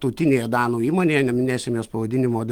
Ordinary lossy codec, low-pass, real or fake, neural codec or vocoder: Opus, 24 kbps; 19.8 kHz; fake; autoencoder, 48 kHz, 128 numbers a frame, DAC-VAE, trained on Japanese speech